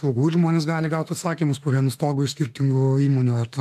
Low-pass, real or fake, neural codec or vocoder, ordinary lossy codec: 14.4 kHz; fake; autoencoder, 48 kHz, 32 numbers a frame, DAC-VAE, trained on Japanese speech; AAC, 96 kbps